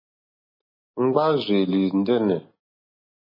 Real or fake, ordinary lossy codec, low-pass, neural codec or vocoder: real; MP3, 24 kbps; 5.4 kHz; none